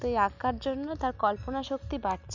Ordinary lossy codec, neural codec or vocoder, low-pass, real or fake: none; none; 7.2 kHz; real